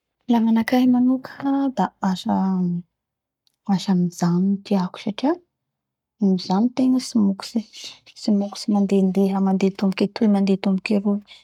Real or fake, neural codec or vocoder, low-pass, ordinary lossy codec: fake; codec, 44.1 kHz, 7.8 kbps, Pupu-Codec; 19.8 kHz; none